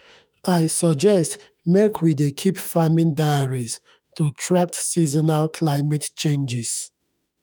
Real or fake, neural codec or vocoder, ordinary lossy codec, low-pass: fake; autoencoder, 48 kHz, 32 numbers a frame, DAC-VAE, trained on Japanese speech; none; none